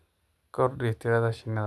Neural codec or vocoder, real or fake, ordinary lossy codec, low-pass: none; real; none; none